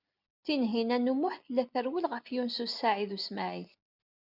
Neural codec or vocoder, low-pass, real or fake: none; 5.4 kHz; real